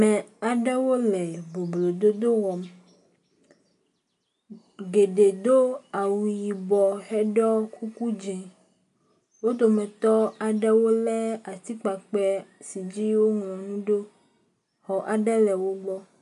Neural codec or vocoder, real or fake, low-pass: none; real; 10.8 kHz